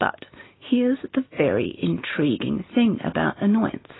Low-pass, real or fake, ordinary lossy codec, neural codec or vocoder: 7.2 kHz; real; AAC, 16 kbps; none